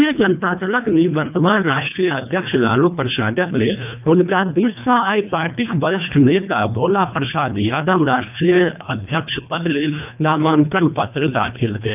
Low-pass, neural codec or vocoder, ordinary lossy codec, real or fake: 3.6 kHz; codec, 24 kHz, 1.5 kbps, HILCodec; none; fake